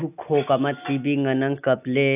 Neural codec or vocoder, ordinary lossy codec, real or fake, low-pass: none; AAC, 32 kbps; real; 3.6 kHz